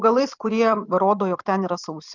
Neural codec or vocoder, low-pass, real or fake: vocoder, 44.1 kHz, 128 mel bands every 256 samples, BigVGAN v2; 7.2 kHz; fake